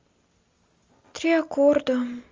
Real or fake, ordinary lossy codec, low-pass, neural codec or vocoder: real; Opus, 32 kbps; 7.2 kHz; none